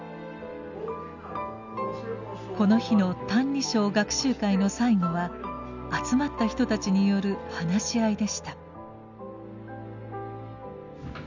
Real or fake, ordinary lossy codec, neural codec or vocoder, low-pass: real; none; none; 7.2 kHz